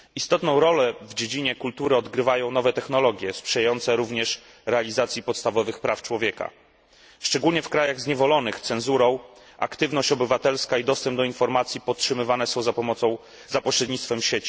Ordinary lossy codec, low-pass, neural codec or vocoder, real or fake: none; none; none; real